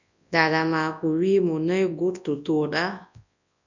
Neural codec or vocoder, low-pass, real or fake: codec, 24 kHz, 0.9 kbps, WavTokenizer, large speech release; 7.2 kHz; fake